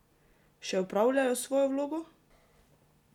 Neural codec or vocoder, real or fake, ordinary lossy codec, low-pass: none; real; none; 19.8 kHz